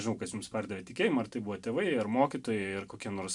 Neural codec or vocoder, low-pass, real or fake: none; 10.8 kHz; real